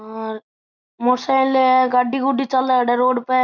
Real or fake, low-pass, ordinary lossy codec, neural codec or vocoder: real; 7.2 kHz; none; none